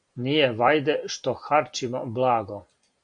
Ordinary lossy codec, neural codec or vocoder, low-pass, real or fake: AAC, 64 kbps; none; 9.9 kHz; real